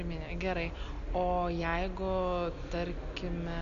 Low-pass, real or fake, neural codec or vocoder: 7.2 kHz; real; none